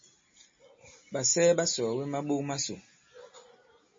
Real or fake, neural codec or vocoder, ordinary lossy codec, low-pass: real; none; MP3, 32 kbps; 7.2 kHz